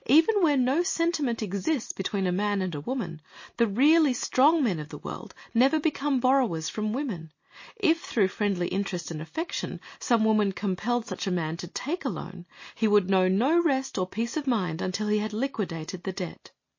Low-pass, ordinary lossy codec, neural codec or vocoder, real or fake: 7.2 kHz; MP3, 32 kbps; none; real